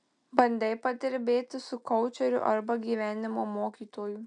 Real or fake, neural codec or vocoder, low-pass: real; none; 9.9 kHz